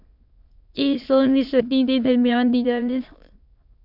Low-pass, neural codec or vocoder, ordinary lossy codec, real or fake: 5.4 kHz; autoencoder, 22.05 kHz, a latent of 192 numbers a frame, VITS, trained on many speakers; MP3, 48 kbps; fake